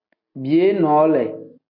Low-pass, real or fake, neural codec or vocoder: 5.4 kHz; real; none